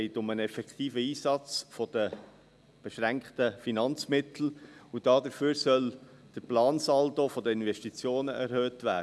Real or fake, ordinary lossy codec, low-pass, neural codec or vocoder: real; none; none; none